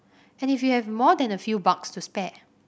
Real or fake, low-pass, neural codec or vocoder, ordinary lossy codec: real; none; none; none